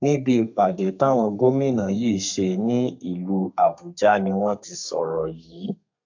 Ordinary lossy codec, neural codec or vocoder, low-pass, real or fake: AAC, 48 kbps; codec, 32 kHz, 1.9 kbps, SNAC; 7.2 kHz; fake